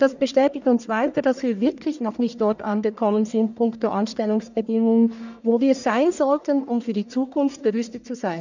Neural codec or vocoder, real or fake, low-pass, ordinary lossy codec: codec, 44.1 kHz, 1.7 kbps, Pupu-Codec; fake; 7.2 kHz; none